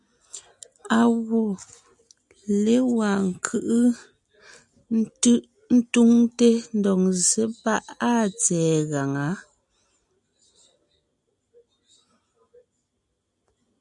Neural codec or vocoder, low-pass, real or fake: none; 10.8 kHz; real